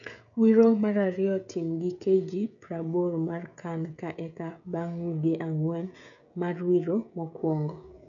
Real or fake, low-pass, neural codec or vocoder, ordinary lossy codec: fake; 7.2 kHz; codec, 16 kHz, 16 kbps, FreqCodec, smaller model; none